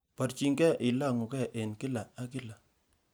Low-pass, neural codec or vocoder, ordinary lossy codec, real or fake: none; none; none; real